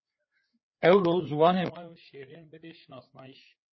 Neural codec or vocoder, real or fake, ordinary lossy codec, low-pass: codec, 16 kHz, 4 kbps, FreqCodec, larger model; fake; MP3, 24 kbps; 7.2 kHz